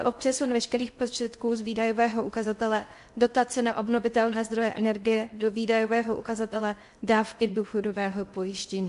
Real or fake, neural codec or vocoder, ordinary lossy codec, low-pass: fake; codec, 16 kHz in and 24 kHz out, 0.6 kbps, FocalCodec, streaming, 2048 codes; MP3, 64 kbps; 10.8 kHz